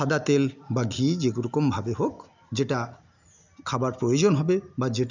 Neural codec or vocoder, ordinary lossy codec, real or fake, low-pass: none; none; real; 7.2 kHz